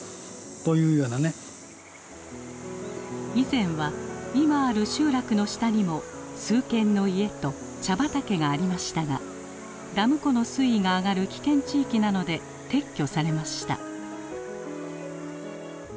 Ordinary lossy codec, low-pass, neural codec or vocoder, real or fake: none; none; none; real